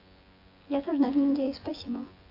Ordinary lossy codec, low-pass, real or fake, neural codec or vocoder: AAC, 32 kbps; 5.4 kHz; fake; vocoder, 24 kHz, 100 mel bands, Vocos